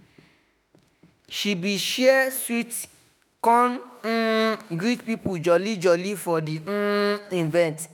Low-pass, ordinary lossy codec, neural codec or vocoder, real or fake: none; none; autoencoder, 48 kHz, 32 numbers a frame, DAC-VAE, trained on Japanese speech; fake